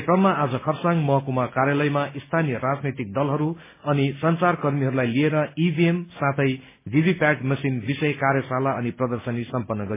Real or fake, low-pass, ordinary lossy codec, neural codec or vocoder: real; 3.6 kHz; MP3, 16 kbps; none